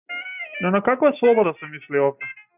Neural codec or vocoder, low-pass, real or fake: none; 3.6 kHz; real